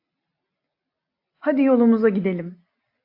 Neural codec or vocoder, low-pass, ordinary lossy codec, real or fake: none; 5.4 kHz; AAC, 32 kbps; real